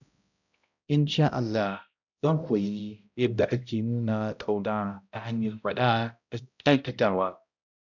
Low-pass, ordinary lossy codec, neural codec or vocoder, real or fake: 7.2 kHz; none; codec, 16 kHz, 0.5 kbps, X-Codec, HuBERT features, trained on balanced general audio; fake